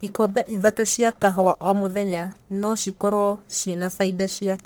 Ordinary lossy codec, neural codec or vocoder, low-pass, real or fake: none; codec, 44.1 kHz, 1.7 kbps, Pupu-Codec; none; fake